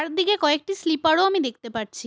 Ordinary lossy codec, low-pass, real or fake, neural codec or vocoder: none; none; real; none